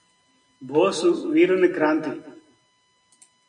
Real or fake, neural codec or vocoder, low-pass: real; none; 9.9 kHz